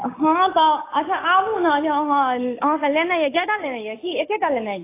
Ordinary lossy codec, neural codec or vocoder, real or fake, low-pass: AAC, 24 kbps; codec, 16 kHz, 6 kbps, DAC; fake; 3.6 kHz